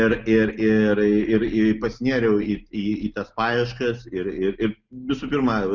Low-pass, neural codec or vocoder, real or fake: 7.2 kHz; none; real